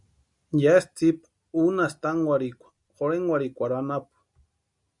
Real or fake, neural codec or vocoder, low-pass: real; none; 10.8 kHz